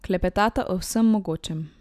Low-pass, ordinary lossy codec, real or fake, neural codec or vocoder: 14.4 kHz; none; real; none